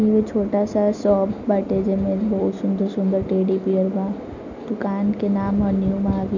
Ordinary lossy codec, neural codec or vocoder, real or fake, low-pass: none; none; real; 7.2 kHz